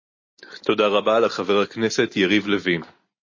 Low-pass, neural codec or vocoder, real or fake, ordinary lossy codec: 7.2 kHz; none; real; MP3, 32 kbps